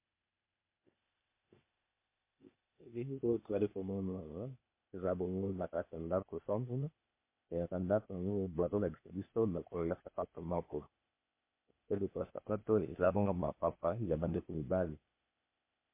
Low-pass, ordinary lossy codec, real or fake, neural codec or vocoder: 3.6 kHz; MP3, 24 kbps; fake; codec, 16 kHz, 0.8 kbps, ZipCodec